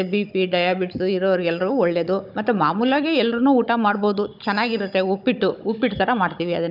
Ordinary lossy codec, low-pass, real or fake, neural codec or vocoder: none; 5.4 kHz; fake; codec, 16 kHz, 16 kbps, FunCodec, trained on Chinese and English, 50 frames a second